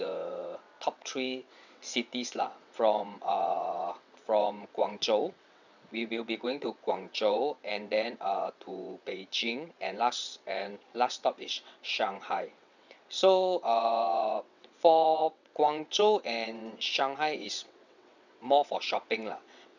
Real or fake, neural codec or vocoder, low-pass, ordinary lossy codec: fake; vocoder, 22.05 kHz, 80 mel bands, WaveNeXt; 7.2 kHz; none